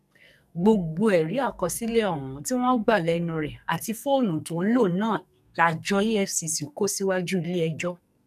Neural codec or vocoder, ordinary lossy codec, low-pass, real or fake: codec, 44.1 kHz, 2.6 kbps, SNAC; none; 14.4 kHz; fake